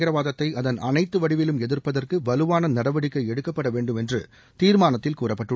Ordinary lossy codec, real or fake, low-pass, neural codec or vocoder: none; real; none; none